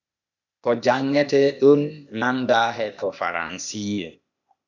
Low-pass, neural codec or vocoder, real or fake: 7.2 kHz; codec, 16 kHz, 0.8 kbps, ZipCodec; fake